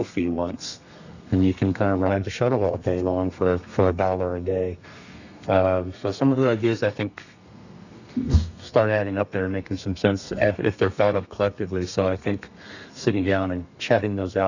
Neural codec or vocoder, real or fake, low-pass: codec, 32 kHz, 1.9 kbps, SNAC; fake; 7.2 kHz